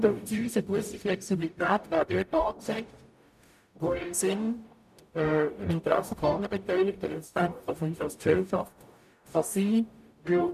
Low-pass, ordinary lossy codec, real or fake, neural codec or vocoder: 14.4 kHz; none; fake; codec, 44.1 kHz, 0.9 kbps, DAC